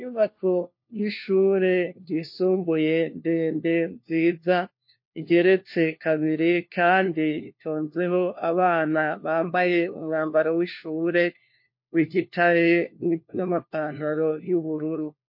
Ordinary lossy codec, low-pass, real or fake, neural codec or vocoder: MP3, 32 kbps; 5.4 kHz; fake; codec, 16 kHz, 1 kbps, FunCodec, trained on LibriTTS, 50 frames a second